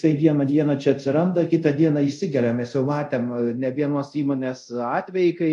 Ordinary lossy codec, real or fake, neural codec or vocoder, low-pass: MP3, 64 kbps; fake; codec, 24 kHz, 0.5 kbps, DualCodec; 10.8 kHz